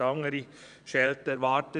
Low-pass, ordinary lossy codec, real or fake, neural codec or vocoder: 9.9 kHz; none; fake; vocoder, 22.05 kHz, 80 mel bands, Vocos